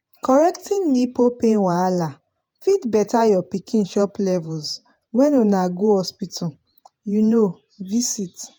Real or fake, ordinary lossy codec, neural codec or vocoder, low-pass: fake; none; vocoder, 48 kHz, 128 mel bands, Vocos; none